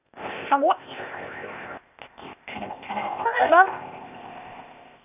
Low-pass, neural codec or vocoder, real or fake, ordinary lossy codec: 3.6 kHz; codec, 16 kHz, 0.8 kbps, ZipCodec; fake; none